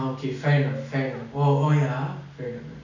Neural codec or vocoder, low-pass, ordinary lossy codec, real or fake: none; 7.2 kHz; none; real